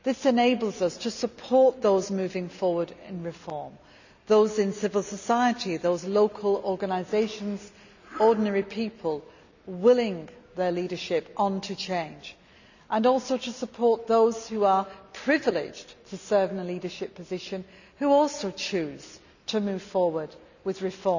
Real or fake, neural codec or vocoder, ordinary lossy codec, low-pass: real; none; none; 7.2 kHz